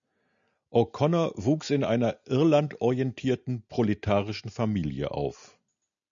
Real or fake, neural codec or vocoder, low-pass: real; none; 7.2 kHz